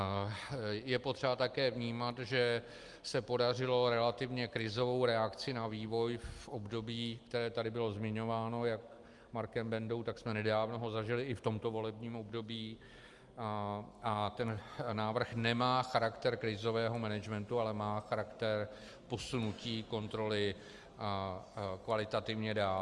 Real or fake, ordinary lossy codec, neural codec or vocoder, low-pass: real; Opus, 32 kbps; none; 10.8 kHz